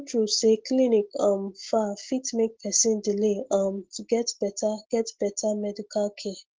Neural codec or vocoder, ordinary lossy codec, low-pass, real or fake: none; Opus, 16 kbps; 7.2 kHz; real